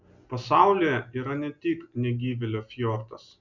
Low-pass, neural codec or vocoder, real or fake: 7.2 kHz; none; real